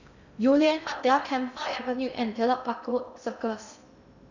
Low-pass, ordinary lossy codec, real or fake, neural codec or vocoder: 7.2 kHz; none; fake; codec, 16 kHz in and 24 kHz out, 0.6 kbps, FocalCodec, streaming, 2048 codes